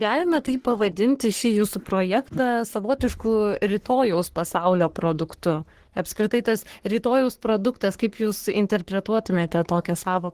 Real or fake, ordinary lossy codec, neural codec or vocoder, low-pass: fake; Opus, 16 kbps; codec, 44.1 kHz, 3.4 kbps, Pupu-Codec; 14.4 kHz